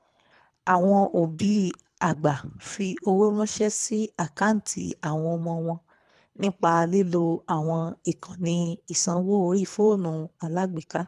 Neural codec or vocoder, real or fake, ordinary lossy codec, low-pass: codec, 24 kHz, 3 kbps, HILCodec; fake; none; none